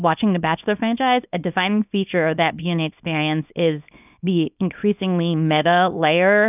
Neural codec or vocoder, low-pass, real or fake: codec, 24 kHz, 0.9 kbps, WavTokenizer, small release; 3.6 kHz; fake